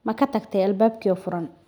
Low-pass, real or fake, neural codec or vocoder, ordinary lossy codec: none; real; none; none